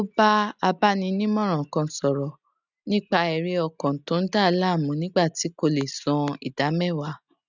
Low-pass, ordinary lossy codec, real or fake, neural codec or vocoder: 7.2 kHz; none; real; none